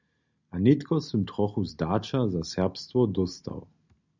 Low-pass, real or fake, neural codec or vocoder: 7.2 kHz; real; none